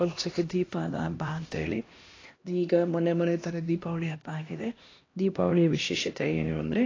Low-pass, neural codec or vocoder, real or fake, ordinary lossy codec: 7.2 kHz; codec, 16 kHz, 1 kbps, X-Codec, HuBERT features, trained on LibriSpeech; fake; AAC, 32 kbps